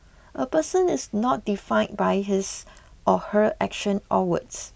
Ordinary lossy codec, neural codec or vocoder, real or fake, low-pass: none; none; real; none